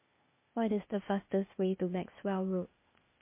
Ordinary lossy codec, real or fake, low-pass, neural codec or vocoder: MP3, 24 kbps; fake; 3.6 kHz; codec, 16 kHz, 0.8 kbps, ZipCodec